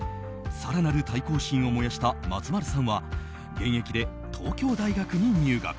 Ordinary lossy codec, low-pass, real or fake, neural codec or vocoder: none; none; real; none